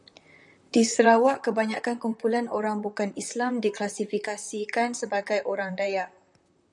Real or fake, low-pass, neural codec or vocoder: fake; 10.8 kHz; vocoder, 44.1 kHz, 128 mel bands, Pupu-Vocoder